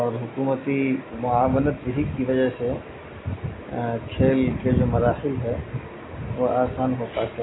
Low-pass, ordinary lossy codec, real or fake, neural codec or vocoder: 7.2 kHz; AAC, 16 kbps; real; none